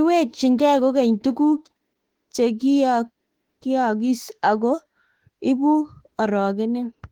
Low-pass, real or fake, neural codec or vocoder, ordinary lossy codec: 19.8 kHz; fake; autoencoder, 48 kHz, 32 numbers a frame, DAC-VAE, trained on Japanese speech; Opus, 16 kbps